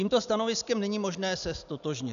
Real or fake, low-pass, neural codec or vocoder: real; 7.2 kHz; none